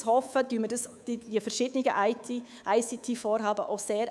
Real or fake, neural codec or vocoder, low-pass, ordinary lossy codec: fake; codec, 24 kHz, 3.1 kbps, DualCodec; none; none